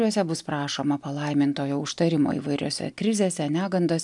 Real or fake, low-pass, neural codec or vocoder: real; 10.8 kHz; none